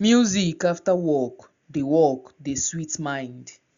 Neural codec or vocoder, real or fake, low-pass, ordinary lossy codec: none; real; 7.2 kHz; Opus, 64 kbps